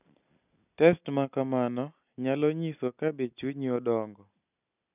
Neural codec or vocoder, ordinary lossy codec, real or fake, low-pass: none; none; real; 3.6 kHz